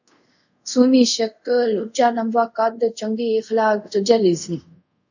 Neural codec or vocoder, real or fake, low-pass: codec, 24 kHz, 0.5 kbps, DualCodec; fake; 7.2 kHz